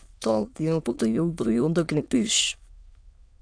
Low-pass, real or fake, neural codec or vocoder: 9.9 kHz; fake; autoencoder, 22.05 kHz, a latent of 192 numbers a frame, VITS, trained on many speakers